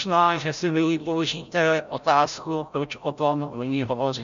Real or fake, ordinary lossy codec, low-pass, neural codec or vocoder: fake; MP3, 64 kbps; 7.2 kHz; codec, 16 kHz, 0.5 kbps, FreqCodec, larger model